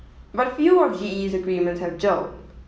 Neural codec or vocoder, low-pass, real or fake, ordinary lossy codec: none; none; real; none